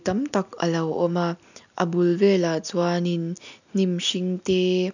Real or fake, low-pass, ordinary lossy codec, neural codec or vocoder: real; 7.2 kHz; none; none